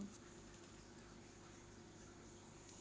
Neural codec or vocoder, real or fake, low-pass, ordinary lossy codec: none; real; none; none